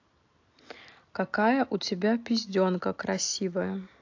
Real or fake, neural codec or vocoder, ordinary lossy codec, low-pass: real; none; AAC, 48 kbps; 7.2 kHz